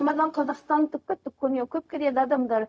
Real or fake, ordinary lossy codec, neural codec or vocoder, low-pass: fake; none; codec, 16 kHz, 0.4 kbps, LongCat-Audio-Codec; none